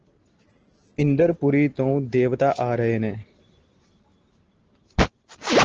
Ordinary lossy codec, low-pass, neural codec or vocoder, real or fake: Opus, 16 kbps; 7.2 kHz; none; real